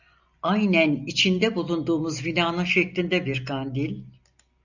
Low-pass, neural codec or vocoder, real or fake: 7.2 kHz; none; real